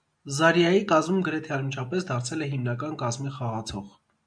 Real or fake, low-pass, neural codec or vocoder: real; 9.9 kHz; none